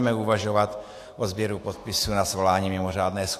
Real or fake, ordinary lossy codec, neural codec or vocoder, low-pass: fake; AAC, 64 kbps; autoencoder, 48 kHz, 128 numbers a frame, DAC-VAE, trained on Japanese speech; 14.4 kHz